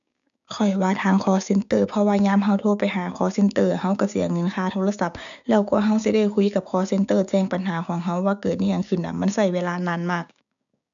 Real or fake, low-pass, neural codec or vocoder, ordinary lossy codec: fake; 7.2 kHz; codec, 16 kHz, 6 kbps, DAC; none